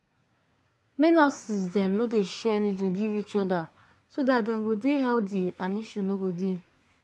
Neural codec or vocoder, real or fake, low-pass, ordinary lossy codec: codec, 24 kHz, 1 kbps, SNAC; fake; none; none